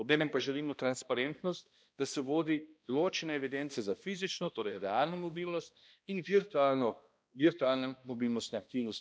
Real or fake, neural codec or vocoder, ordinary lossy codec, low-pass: fake; codec, 16 kHz, 1 kbps, X-Codec, HuBERT features, trained on balanced general audio; none; none